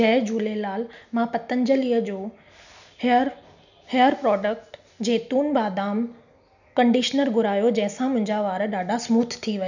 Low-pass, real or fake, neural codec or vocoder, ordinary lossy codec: 7.2 kHz; real; none; none